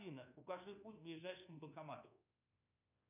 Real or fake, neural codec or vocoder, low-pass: fake; codec, 24 kHz, 1.2 kbps, DualCodec; 3.6 kHz